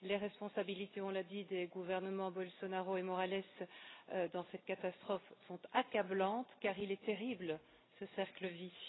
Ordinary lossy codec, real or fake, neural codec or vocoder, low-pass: AAC, 16 kbps; real; none; 7.2 kHz